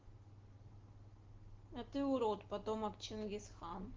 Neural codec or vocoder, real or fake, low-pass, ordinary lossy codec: none; real; 7.2 kHz; Opus, 16 kbps